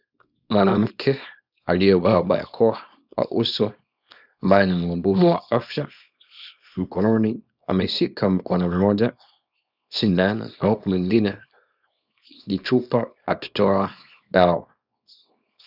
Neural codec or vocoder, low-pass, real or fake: codec, 24 kHz, 0.9 kbps, WavTokenizer, small release; 5.4 kHz; fake